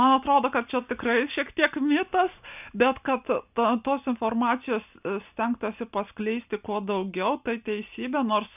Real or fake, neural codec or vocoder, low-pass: real; none; 3.6 kHz